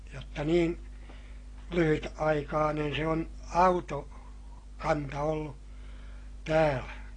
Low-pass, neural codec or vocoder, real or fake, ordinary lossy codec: 9.9 kHz; none; real; AAC, 32 kbps